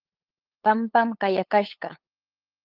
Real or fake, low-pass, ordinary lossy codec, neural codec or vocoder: fake; 5.4 kHz; Opus, 32 kbps; codec, 16 kHz, 8 kbps, FunCodec, trained on LibriTTS, 25 frames a second